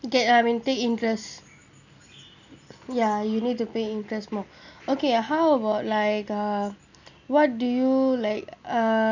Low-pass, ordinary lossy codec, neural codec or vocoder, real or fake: 7.2 kHz; none; none; real